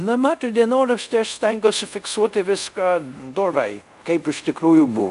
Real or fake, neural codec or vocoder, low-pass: fake; codec, 24 kHz, 0.5 kbps, DualCodec; 10.8 kHz